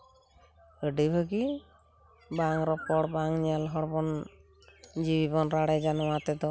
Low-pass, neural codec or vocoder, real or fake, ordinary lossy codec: none; none; real; none